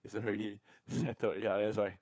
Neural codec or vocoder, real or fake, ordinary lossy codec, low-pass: codec, 16 kHz, 4 kbps, FunCodec, trained on LibriTTS, 50 frames a second; fake; none; none